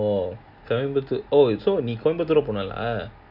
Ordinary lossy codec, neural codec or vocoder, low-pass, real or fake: none; none; 5.4 kHz; real